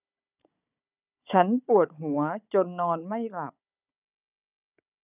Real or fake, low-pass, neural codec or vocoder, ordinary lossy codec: fake; 3.6 kHz; codec, 16 kHz, 16 kbps, FunCodec, trained on Chinese and English, 50 frames a second; none